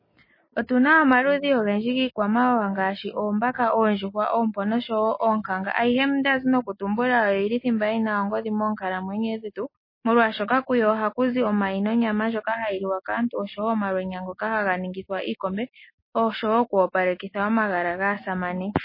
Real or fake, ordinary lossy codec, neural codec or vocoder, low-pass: real; MP3, 24 kbps; none; 5.4 kHz